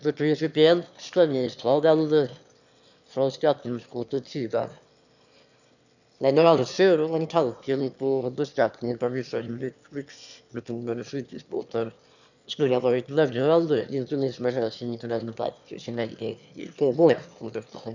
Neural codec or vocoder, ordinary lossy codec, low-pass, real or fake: autoencoder, 22.05 kHz, a latent of 192 numbers a frame, VITS, trained on one speaker; none; 7.2 kHz; fake